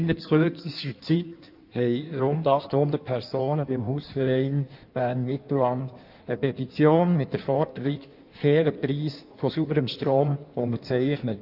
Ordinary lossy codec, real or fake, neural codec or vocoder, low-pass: none; fake; codec, 16 kHz in and 24 kHz out, 1.1 kbps, FireRedTTS-2 codec; 5.4 kHz